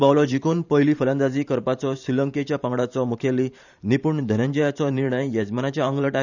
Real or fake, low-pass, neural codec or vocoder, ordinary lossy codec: fake; 7.2 kHz; vocoder, 44.1 kHz, 128 mel bands every 512 samples, BigVGAN v2; none